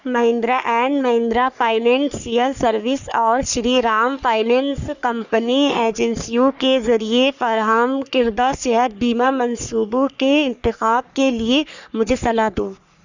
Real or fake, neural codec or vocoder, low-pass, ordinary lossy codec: fake; codec, 44.1 kHz, 3.4 kbps, Pupu-Codec; 7.2 kHz; none